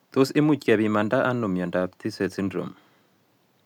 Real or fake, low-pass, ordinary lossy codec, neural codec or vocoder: real; 19.8 kHz; none; none